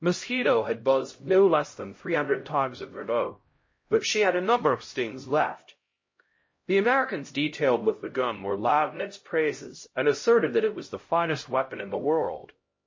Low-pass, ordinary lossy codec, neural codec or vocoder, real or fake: 7.2 kHz; MP3, 32 kbps; codec, 16 kHz, 0.5 kbps, X-Codec, HuBERT features, trained on LibriSpeech; fake